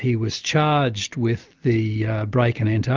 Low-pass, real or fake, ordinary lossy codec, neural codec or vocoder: 7.2 kHz; real; Opus, 24 kbps; none